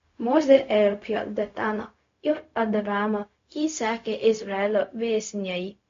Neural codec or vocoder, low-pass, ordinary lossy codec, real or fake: codec, 16 kHz, 0.4 kbps, LongCat-Audio-Codec; 7.2 kHz; MP3, 48 kbps; fake